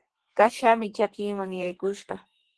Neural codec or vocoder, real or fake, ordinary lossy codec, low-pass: codec, 32 kHz, 1.9 kbps, SNAC; fake; Opus, 16 kbps; 10.8 kHz